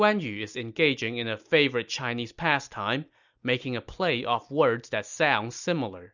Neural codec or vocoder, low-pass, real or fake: none; 7.2 kHz; real